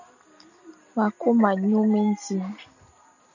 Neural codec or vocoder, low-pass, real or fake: none; 7.2 kHz; real